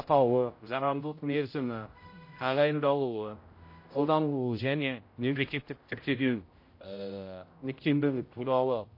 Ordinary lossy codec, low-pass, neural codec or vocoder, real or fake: MP3, 32 kbps; 5.4 kHz; codec, 16 kHz, 0.5 kbps, X-Codec, HuBERT features, trained on general audio; fake